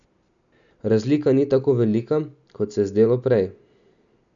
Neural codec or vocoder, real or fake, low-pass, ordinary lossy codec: none; real; 7.2 kHz; none